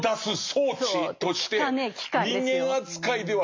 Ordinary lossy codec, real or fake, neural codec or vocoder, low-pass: MP3, 48 kbps; real; none; 7.2 kHz